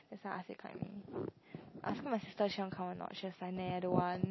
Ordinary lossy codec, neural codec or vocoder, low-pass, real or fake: MP3, 24 kbps; none; 7.2 kHz; real